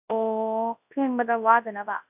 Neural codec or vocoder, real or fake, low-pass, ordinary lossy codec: codec, 24 kHz, 0.9 kbps, WavTokenizer, large speech release; fake; 3.6 kHz; AAC, 32 kbps